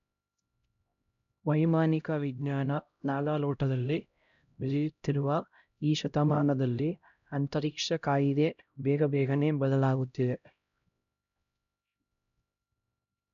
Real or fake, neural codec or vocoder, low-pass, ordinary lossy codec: fake; codec, 16 kHz, 0.5 kbps, X-Codec, HuBERT features, trained on LibriSpeech; 7.2 kHz; none